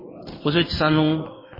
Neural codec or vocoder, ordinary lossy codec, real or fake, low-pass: codec, 16 kHz in and 24 kHz out, 1 kbps, XY-Tokenizer; MP3, 24 kbps; fake; 5.4 kHz